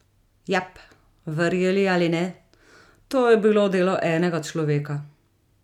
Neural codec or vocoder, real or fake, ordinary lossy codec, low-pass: none; real; none; 19.8 kHz